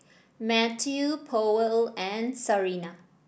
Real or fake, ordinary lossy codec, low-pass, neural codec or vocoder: real; none; none; none